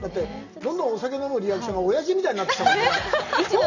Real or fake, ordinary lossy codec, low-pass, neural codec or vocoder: real; none; 7.2 kHz; none